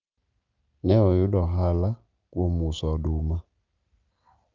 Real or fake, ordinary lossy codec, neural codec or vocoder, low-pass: real; Opus, 24 kbps; none; 7.2 kHz